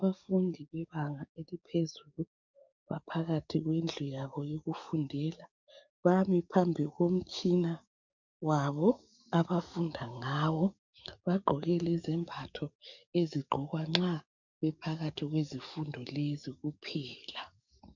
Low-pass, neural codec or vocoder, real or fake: 7.2 kHz; codec, 44.1 kHz, 7.8 kbps, DAC; fake